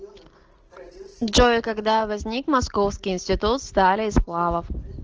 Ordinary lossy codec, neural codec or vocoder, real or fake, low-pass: Opus, 16 kbps; none; real; 7.2 kHz